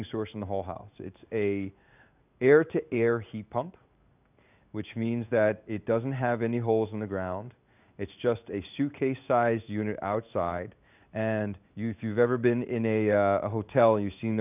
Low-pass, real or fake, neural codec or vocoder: 3.6 kHz; fake; codec, 16 kHz in and 24 kHz out, 1 kbps, XY-Tokenizer